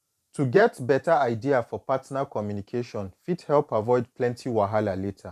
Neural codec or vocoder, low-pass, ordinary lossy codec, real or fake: vocoder, 44.1 kHz, 128 mel bands every 512 samples, BigVGAN v2; 14.4 kHz; none; fake